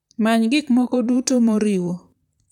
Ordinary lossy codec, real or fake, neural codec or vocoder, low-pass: none; fake; vocoder, 44.1 kHz, 128 mel bands, Pupu-Vocoder; 19.8 kHz